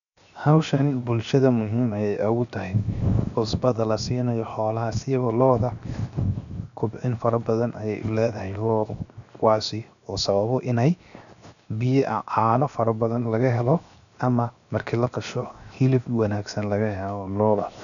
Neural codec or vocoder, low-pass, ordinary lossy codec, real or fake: codec, 16 kHz, 0.7 kbps, FocalCodec; 7.2 kHz; none; fake